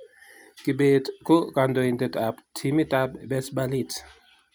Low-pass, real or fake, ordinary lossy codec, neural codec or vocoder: none; real; none; none